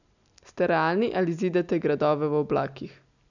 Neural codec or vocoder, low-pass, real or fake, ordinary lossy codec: none; 7.2 kHz; real; none